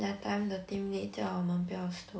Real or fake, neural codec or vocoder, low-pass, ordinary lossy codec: real; none; none; none